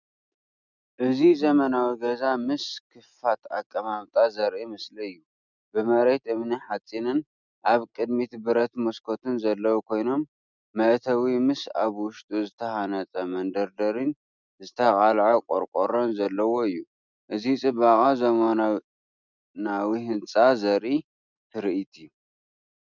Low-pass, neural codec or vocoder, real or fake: 7.2 kHz; none; real